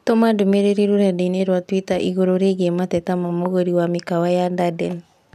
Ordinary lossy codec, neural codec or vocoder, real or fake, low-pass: none; none; real; 14.4 kHz